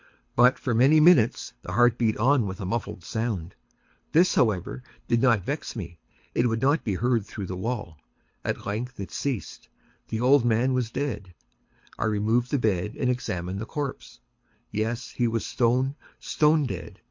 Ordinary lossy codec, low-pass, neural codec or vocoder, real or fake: MP3, 48 kbps; 7.2 kHz; codec, 24 kHz, 6 kbps, HILCodec; fake